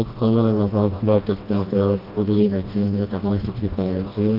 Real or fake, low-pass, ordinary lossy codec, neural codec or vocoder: fake; 5.4 kHz; Opus, 32 kbps; codec, 16 kHz, 1 kbps, FreqCodec, smaller model